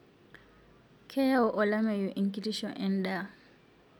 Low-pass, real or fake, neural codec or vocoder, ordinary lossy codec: none; real; none; none